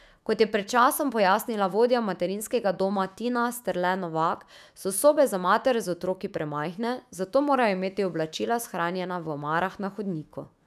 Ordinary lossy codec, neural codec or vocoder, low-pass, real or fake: none; autoencoder, 48 kHz, 128 numbers a frame, DAC-VAE, trained on Japanese speech; 14.4 kHz; fake